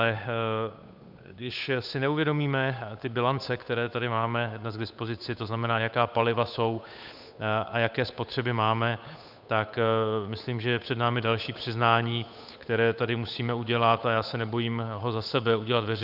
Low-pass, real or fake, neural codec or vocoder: 5.4 kHz; fake; codec, 16 kHz, 8 kbps, FunCodec, trained on Chinese and English, 25 frames a second